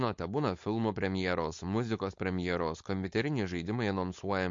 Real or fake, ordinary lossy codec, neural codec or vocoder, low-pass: fake; MP3, 48 kbps; codec, 16 kHz, 4.8 kbps, FACodec; 7.2 kHz